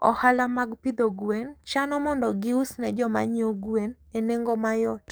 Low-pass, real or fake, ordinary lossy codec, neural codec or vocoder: none; fake; none; codec, 44.1 kHz, 7.8 kbps, DAC